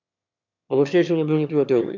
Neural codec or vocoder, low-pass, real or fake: autoencoder, 22.05 kHz, a latent of 192 numbers a frame, VITS, trained on one speaker; 7.2 kHz; fake